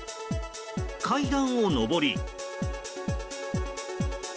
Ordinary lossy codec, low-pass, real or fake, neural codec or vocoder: none; none; real; none